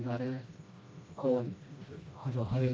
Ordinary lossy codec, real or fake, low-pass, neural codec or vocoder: none; fake; none; codec, 16 kHz, 1 kbps, FreqCodec, smaller model